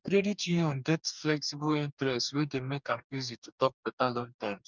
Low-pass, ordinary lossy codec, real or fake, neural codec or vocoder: 7.2 kHz; none; fake; codec, 44.1 kHz, 2.6 kbps, DAC